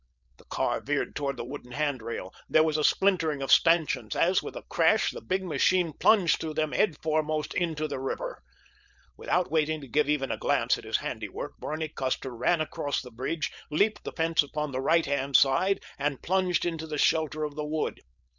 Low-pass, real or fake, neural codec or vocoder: 7.2 kHz; fake; codec, 16 kHz, 4.8 kbps, FACodec